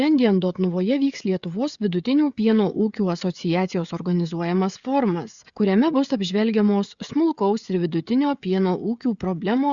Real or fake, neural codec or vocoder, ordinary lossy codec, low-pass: fake; codec, 16 kHz, 16 kbps, FreqCodec, smaller model; Opus, 64 kbps; 7.2 kHz